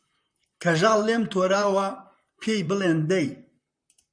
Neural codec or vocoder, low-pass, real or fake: vocoder, 44.1 kHz, 128 mel bands, Pupu-Vocoder; 9.9 kHz; fake